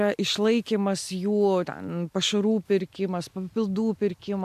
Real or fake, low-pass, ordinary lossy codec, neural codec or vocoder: real; 14.4 kHz; AAC, 96 kbps; none